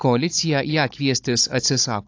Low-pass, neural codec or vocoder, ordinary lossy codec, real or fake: 7.2 kHz; codec, 16 kHz, 16 kbps, FunCodec, trained on Chinese and English, 50 frames a second; AAC, 48 kbps; fake